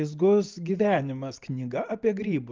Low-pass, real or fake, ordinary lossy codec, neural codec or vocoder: 7.2 kHz; real; Opus, 32 kbps; none